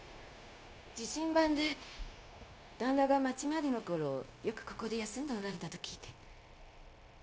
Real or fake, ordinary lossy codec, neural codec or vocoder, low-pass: fake; none; codec, 16 kHz, 0.9 kbps, LongCat-Audio-Codec; none